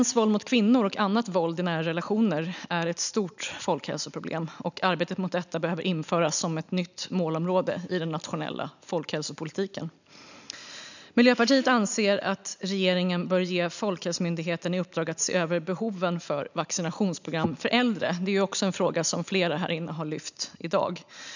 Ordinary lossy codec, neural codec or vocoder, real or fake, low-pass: none; none; real; 7.2 kHz